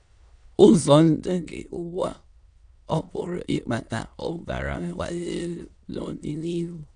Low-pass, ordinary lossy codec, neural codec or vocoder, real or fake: 9.9 kHz; none; autoencoder, 22.05 kHz, a latent of 192 numbers a frame, VITS, trained on many speakers; fake